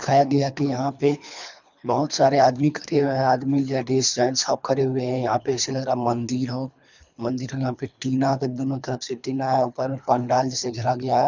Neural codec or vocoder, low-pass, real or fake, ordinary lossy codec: codec, 24 kHz, 3 kbps, HILCodec; 7.2 kHz; fake; none